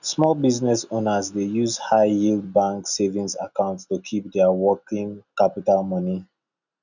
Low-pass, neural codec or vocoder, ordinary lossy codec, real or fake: 7.2 kHz; none; none; real